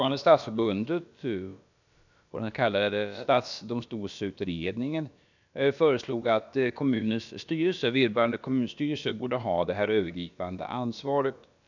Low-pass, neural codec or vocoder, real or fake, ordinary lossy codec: 7.2 kHz; codec, 16 kHz, about 1 kbps, DyCAST, with the encoder's durations; fake; none